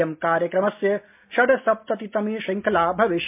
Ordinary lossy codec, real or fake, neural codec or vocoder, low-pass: MP3, 32 kbps; real; none; 3.6 kHz